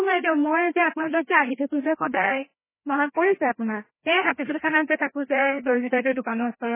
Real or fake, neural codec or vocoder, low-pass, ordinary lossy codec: fake; codec, 16 kHz, 1 kbps, FreqCodec, larger model; 3.6 kHz; MP3, 16 kbps